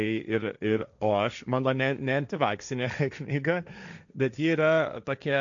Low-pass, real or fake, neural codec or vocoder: 7.2 kHz; fake; codec, 16 kHz, 1.1 kbps, Voila-Tokenizer